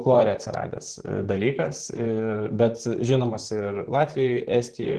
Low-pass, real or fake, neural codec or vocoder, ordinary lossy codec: 10.8 kHz; fake; vocoder, 44.1 kHz, 128 mel bands, Pupu-Vocoder; Opus, 16 kbps